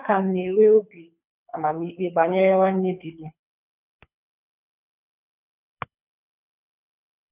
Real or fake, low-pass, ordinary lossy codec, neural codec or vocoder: fake; 3.6 kHz; none; codec, 24 kHz, 3 kbps, HILCodec